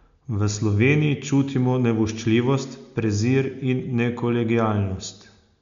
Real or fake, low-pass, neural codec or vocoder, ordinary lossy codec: real; 7.2 kHz; none; MP3, 64 kbps